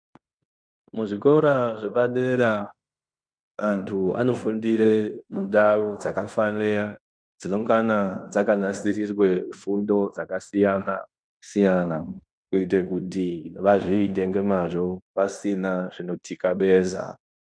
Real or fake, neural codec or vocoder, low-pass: fake; codec, 16 kHz in and 24 kHz out, 0.9 kbps, LongCat-Audio-Codec, fine tuned four codebook decoder; 9.9 kHz